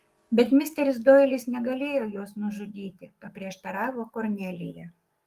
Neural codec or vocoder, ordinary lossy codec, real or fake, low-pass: codec, 44.1 kHz, 7.8 kbps, DAC; Opus, 32 kbps; fake; 14.4 kHz